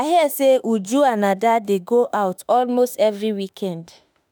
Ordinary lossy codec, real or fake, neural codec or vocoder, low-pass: none; fake; autoencoder, 48 kHz, 32 numbers a frame, DAC-VAE, trained on Japanese speech; none